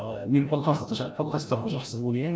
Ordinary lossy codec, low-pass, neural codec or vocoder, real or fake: none; none; codec, 16 kHz, 0.5 kbps, FreqCodec, larger model; fake